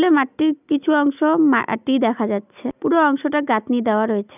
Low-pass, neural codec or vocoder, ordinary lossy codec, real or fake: 3.6 kHz; none; none; real